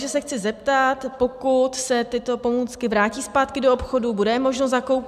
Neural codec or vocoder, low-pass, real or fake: none; 14.4 kHz; real